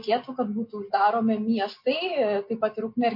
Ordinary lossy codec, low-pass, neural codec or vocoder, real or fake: MP3, 32 kbps; 5.4 kHz; none; real